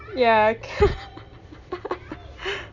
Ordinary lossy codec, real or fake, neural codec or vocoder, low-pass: none; real; none; 7.2 kHz